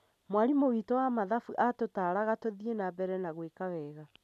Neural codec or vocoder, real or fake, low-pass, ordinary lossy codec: none; real; 14.4 kHz; none